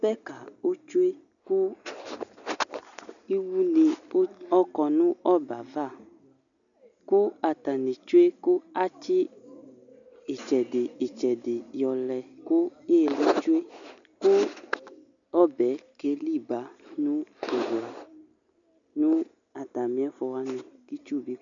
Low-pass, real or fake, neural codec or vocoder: 7.2 kHz; real; none